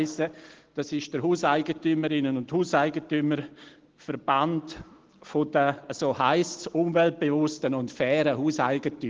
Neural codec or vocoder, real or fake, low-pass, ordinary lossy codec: none; real; 7.2 kHz; Opus, 16 kbps